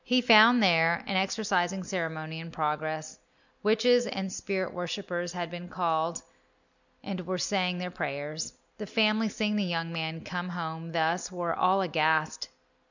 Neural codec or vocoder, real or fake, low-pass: none; real; 7.2 kHz